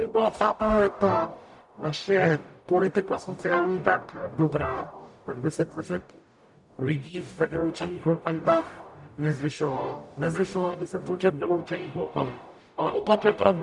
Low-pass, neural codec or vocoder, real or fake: 10.8 kHz; codec, 44.1 kHz, 0.9 kbps, DAC; fake